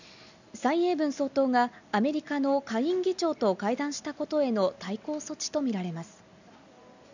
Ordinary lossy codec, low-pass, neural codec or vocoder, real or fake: none; 7.2 kHz; none; real